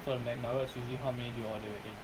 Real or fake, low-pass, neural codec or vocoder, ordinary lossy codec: fake; 19.8 kHz; vocoder, 44.1 kHz, 128 mel bands every 256 samples, BigVGAN v2; Opus, 24 kbps